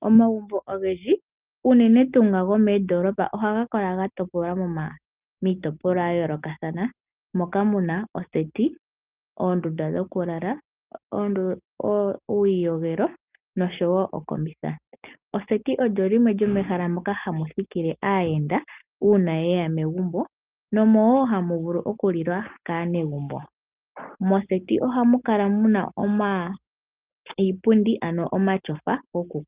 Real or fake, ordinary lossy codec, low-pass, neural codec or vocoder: real; Opus, 24 kbps; 3.6 kHz; none